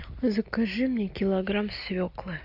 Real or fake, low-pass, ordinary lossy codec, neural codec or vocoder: real; 5.4 kHz; AAC, 48 kbps; none